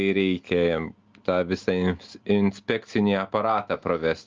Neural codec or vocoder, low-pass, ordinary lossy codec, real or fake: none; 7.2 kHz; Opus, 24 kbps; real